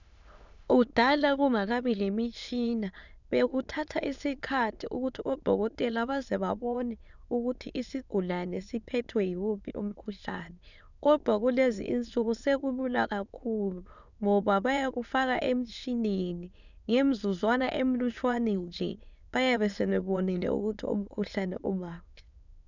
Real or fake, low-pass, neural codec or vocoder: fake; 7.2 kHz; autoencoder, 22.05 kHz, a latent of 192 numbers a frame, VITS, trained on many speakers